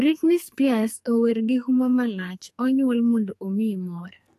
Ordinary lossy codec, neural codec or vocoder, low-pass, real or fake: AAC, 64 kbps; codec, 44.1 kHz, 2.6 kbps, SNAC; 14.4 kHz; fake